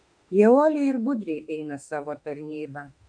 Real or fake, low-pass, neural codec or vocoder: fake; 9.9 kHz; autoencoder, 48 kHz, 32 numbers a frame, DAC-VAE, trained on Japanese speech